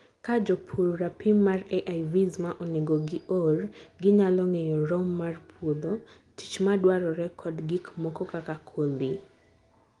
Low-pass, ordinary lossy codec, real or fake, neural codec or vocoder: 10.8 kHz; Opus, 24 kbps; real; none